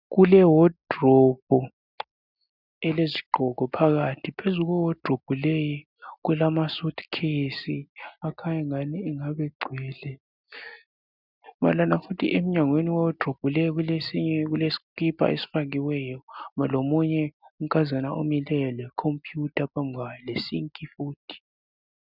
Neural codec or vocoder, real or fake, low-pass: none; real; 5.4 kHz